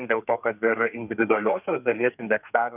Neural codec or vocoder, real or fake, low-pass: codec, 32 kHz, 1.9 kbps, SNAC; fake; 3.6 kHz